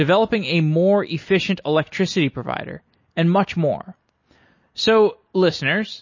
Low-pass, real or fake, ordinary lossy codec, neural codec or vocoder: 7.2 kHz; real; MP3, 32 kbps; none